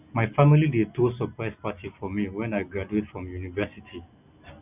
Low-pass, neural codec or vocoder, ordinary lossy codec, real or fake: 3.6 kHz; none; none; real